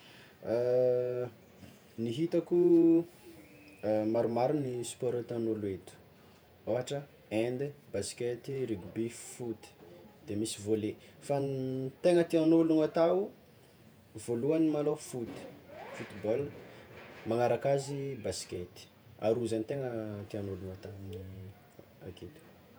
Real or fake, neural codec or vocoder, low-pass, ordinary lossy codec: fake; vocoder, 48 kHz, 128 mel bands, Vocos; none; none